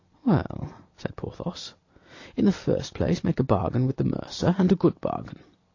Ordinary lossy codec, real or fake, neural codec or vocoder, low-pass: AAC, 48 kbps; real; none; 7.2 kHz